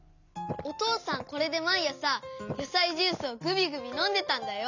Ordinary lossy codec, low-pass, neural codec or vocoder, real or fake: none; 7.2 kHz; none; real